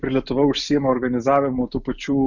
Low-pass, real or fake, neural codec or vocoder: 7.2 kHz; real; none